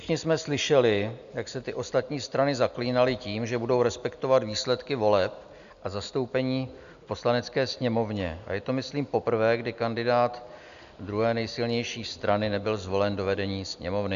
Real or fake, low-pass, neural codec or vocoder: real; 7.2 kHz; none